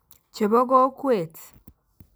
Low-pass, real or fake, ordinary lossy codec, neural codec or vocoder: none; real; none; none